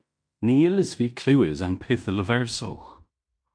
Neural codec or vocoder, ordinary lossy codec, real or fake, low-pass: codec, 16 kHz in and 24 kHz out, 0.9 kbps, LongCat-Audio-Codec, fine tuned four codebook decoder; MP3, 64 kbps; fake; 9.9 kHz